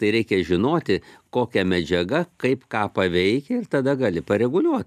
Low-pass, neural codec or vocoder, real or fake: 14.4 kHz; none; real